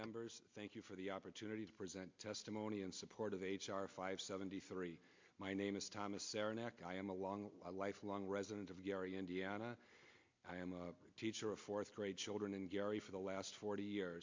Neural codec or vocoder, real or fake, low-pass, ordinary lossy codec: none; real; 7.2 kHz; MP3, 48 kbps